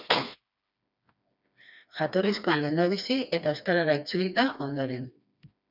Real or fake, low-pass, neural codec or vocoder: fake; 5.4 kHz; codec, 16 kHz, 2 kbps, FreqCodec, smaller model